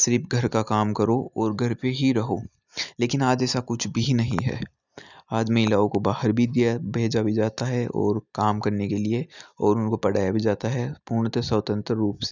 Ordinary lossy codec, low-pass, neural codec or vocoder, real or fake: none; 7.2 kHz; none; real